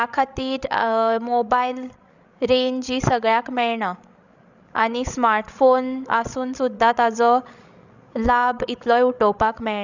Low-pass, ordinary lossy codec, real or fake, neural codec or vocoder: 7.2 kHz; none; fake; codec, 16 kHz, 16 kbps, FreqCodec, larger model